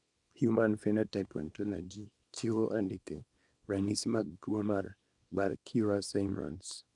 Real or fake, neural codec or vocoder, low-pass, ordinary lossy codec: fake; codec, 24 kHz, 0.9 kbps, WavTokenizer, small release; 10.8 kHz; none